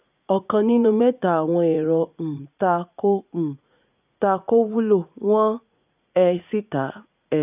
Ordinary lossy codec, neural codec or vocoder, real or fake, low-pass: none; vocoder, 44.1 kHz, 80 mel bands, Vocos; fake; 3.6 kHz